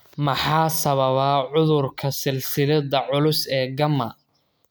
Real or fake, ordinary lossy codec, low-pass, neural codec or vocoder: real; none; none; none